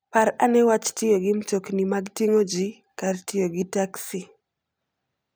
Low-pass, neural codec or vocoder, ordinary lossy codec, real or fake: none; none; none; real